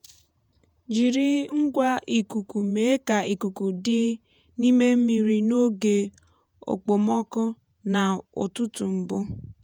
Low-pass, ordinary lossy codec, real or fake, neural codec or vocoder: 19.8 kHz; none; fake; vocoder, 48 kHz, 128 mel bands, Vocos